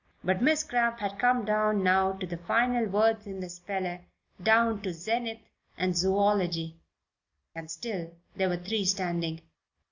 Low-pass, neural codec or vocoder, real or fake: 7.2 kHz; none; real